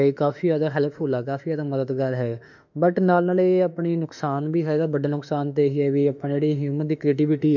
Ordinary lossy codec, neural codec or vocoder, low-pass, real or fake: none; autoencoder, 48 kHz, 32 numbers a frame, DAC-VAE, trained on Japanese speech; 7.2 kHz; fake